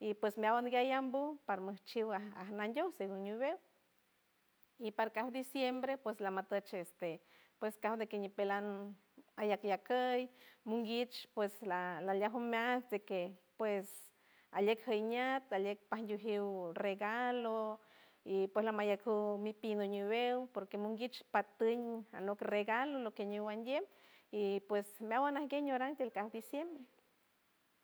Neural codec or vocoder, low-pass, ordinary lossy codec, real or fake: none; none; none; real